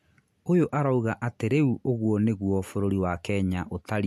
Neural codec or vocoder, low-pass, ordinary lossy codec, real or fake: none; 14.4 kHz; MP3, 64 kbps; real